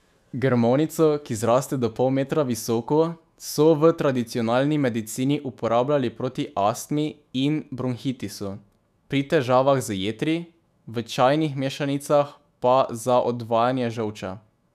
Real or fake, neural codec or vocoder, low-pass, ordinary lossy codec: fake; autoencoder, 48 kHz, 128 numbers a frame, DAC-VAE, trained on Japanese speech; 14.4 kHz; none